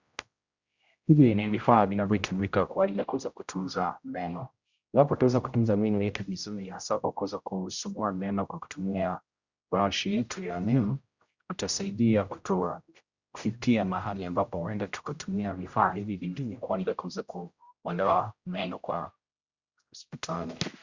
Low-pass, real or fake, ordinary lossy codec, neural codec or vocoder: 7.2 kHz; fake; Opus, 64 kbps; codec, 16 kHz, 0.5 kbps, X-Codec, HuBERT features, trained on general audio